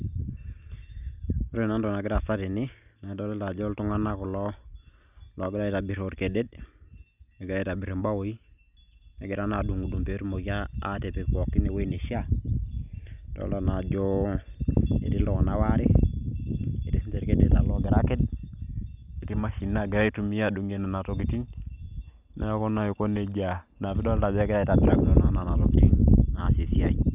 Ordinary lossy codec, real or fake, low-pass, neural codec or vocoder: none; real; 3.6 kHz; none